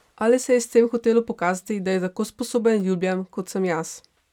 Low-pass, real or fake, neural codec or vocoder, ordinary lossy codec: 19.8 kHz; fake; vocoder, 44.1 kHz, 128 mel bands every 256 samples, BigVGAN v2; none